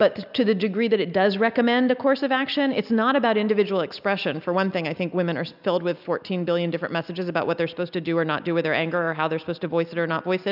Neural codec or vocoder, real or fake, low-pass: none; real; 5.4 kHz